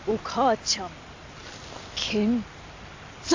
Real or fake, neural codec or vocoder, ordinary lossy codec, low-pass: real; none; AAC, 48 kbps; 7.2 kHz